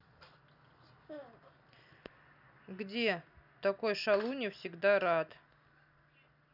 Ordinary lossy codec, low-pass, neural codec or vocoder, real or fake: none; 5.4 kHz; none; real